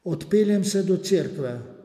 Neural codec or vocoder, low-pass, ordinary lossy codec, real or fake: none; 14.4 kHz; MP3, 96 kbps; real